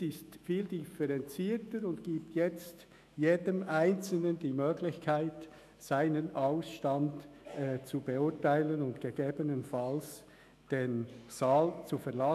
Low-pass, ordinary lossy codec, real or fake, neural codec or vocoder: 14.4 kHz; none; fake; autoencoder, 48 kHz, 128 numbers a frame, DAC-VAE, trained on Japanese speech